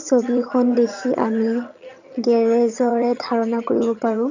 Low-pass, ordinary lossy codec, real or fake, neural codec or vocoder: 7.2 kHz; none; fake; vocoder, 22.05 kHz, 80 mel bands, HiFi-GAN